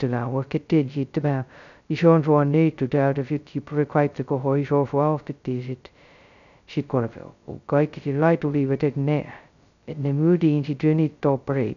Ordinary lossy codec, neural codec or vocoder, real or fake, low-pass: none; codec, 16 kHz, 0.2 kbps, FocalCodec; fake; 7.2 kHz